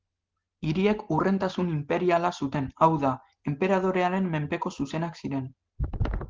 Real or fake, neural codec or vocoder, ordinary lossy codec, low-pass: real; none; Opus, 16 kbps; 7.2 kHz